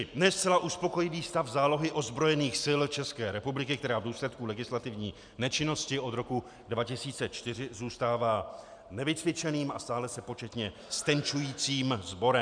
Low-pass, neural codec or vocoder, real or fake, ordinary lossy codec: 9.9 kHz; none; real; MP3, 96 kbps